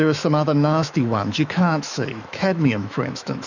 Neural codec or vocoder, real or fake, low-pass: autoencoder, 48 kHz, 128 numbers a frame, DAC-VAE, trained on Japanese speech; fake; 7.2 kHz